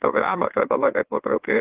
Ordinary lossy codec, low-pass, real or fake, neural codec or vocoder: Opus, 16 kbps; 3.6 kHz; fake; autoencoder, 44.1 kHz, a latent of 192 numbers a frame, MeloTTS